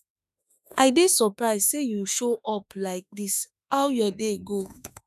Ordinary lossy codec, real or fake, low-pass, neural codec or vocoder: none; fake; 14.4 kHz; autoencoder, 48 kHz, 32 numbers a frame, DAC-VAE, trained on Japanese speech